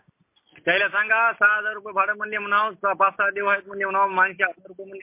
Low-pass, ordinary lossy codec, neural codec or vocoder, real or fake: 3.6 kHz; MP3, 24 kbps; none; real